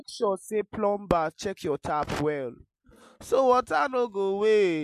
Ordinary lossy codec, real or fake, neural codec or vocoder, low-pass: MP3, 64 kbps; real; none; 14.4 kHz